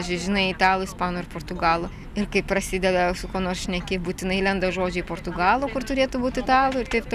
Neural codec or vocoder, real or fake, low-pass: none; real; 14.4 kHz